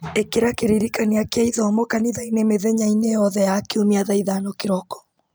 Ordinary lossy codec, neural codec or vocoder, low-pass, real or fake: none; none; none; real